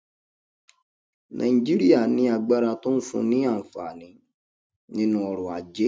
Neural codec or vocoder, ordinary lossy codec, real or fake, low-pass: none; none; real; none